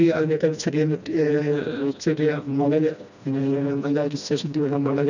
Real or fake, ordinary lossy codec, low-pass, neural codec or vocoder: fake; none; 7.2 kHz; codec, 16 kHz, 1 kbps, FreqCodec, smaller model